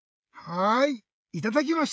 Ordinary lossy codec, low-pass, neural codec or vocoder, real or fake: none; none; codec, 16 kHz, 16 kbps, FreqCodec, smaller model; fake